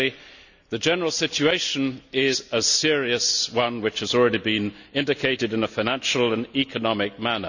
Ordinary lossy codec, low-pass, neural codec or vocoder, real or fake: none; 7.2 kHz; none; real